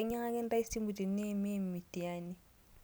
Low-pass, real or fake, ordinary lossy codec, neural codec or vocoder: none; real; none; none